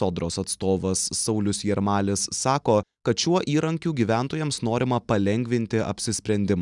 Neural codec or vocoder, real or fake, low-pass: none; real; 10.8 kHz